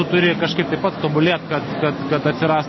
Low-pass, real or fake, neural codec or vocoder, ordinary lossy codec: 7.2 kHz; real; none; MP3, 24 kbps